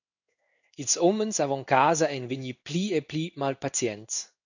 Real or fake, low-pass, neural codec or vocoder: fake; 7.2 kHz; codec, 16 kHz in and 24 kHz out, 1 kbps, XY-Tokenizer